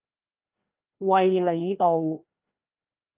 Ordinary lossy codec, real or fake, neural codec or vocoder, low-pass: Opus, 24 kbps; fake; codec, 16 kHz, 1 kbps, FreqCodec, larger model; 3.6 kHz